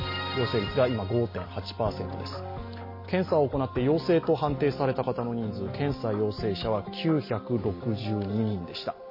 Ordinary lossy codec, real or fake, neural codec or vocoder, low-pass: MP3, 24 kbps; real; none; 5.4 kHz